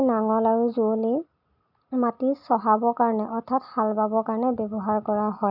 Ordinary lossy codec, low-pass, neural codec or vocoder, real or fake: none; 5.4 kHz; none; real